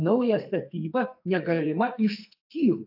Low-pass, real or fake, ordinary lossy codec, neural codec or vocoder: 5.4 kHz; fake; AAC, 48 kbps; codec, 44.1 kHz, 2.6 kbps, SNAC